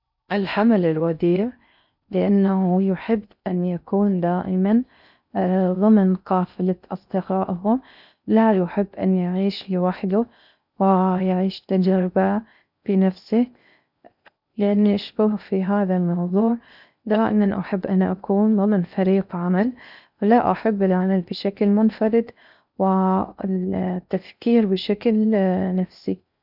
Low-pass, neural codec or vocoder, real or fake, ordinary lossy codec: 5.4 kHz; codec, 16 kHz in and 24 kHz out, 0.6 kbps, FocalCodec, streaming, 4096 codes; fake; none